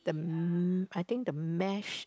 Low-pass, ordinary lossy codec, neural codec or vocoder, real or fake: none; none; none; real